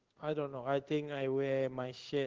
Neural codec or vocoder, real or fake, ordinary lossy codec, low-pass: codec, 16 kHz in and 24 kHz out, 1 kbps, XY-Tokenizer; fake; Opus, 16 kbps; 7.2 kHz